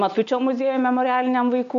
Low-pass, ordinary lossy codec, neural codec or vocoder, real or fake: 7.2 kHz; AAC, 96 kbps; none; real